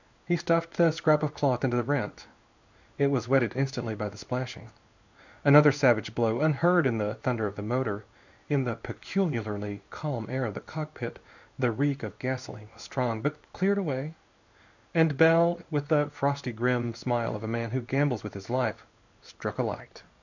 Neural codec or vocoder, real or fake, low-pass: codec, 16 kHz in and 24 kHz out, 1 kbps, XY-Tokenizer; fake; 7.2 kHz